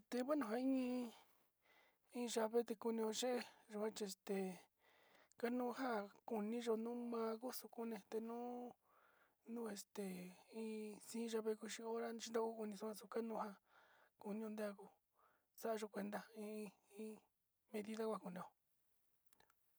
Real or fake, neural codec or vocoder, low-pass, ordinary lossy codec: real; none; none; none